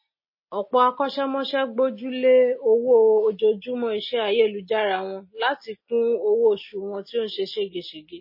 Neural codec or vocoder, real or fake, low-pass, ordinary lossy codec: none; real; 5.4 kHz; MP3, 24 kbps